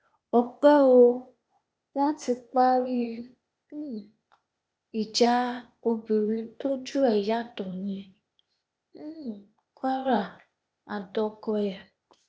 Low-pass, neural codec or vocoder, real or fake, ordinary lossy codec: none; codec, 16 kHz, 0.8 kbps, ZipCodec; fake; none